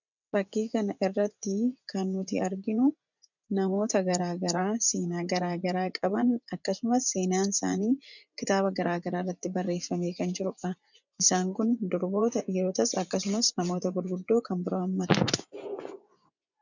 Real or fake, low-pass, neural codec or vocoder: fake; 7.2 kHz; vocoder, 24 kHz, 100 mel bands, Vocos